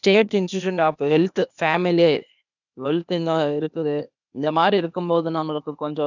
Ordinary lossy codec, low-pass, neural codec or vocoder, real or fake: none; 7.2 kHz; codec, 16 kHz, 0.8 kbps, ZipCodec; fake